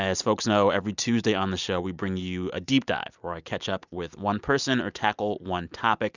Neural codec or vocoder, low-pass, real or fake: none; 7.2 kHz; real